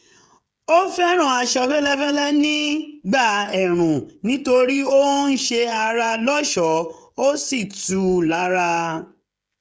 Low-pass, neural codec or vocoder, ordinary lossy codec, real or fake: none; codec, 16 kHz, 16 kbps, FreqCodec, smaller model; none; fake